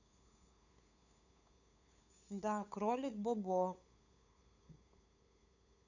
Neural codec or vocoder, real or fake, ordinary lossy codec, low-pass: codec, 16 kHz, 8 kbps, FunCodec, trained on LibriTTS, 25 frames a second; fake; none; 7.2 kHz